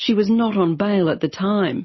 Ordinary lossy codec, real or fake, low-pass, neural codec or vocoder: MP3, 24 kbps; real; 7.2 kHz; none